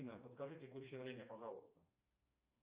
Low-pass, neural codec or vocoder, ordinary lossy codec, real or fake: 3.6 kHz; codec, 16 kHz, 2 kbps, FreqCodec, smaller model; Opus, 64 kbps; fake